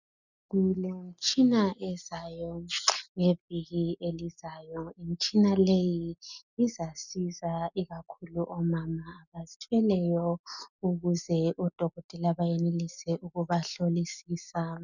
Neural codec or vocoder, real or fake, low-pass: none; real; 7.2 kHz